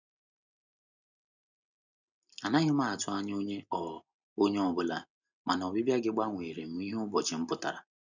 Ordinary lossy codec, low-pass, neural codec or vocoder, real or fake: none; 7.2 kHz; none; real